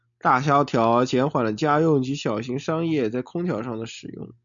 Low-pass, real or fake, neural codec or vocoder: 7.2 kHz; real; none